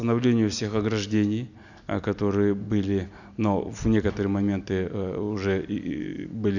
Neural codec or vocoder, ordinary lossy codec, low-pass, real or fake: none; none; 7.2 kHz; real